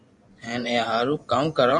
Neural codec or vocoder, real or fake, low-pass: vocoder, 24 kHz, 100 mel bands, Vocos; fake; 10.8 kHz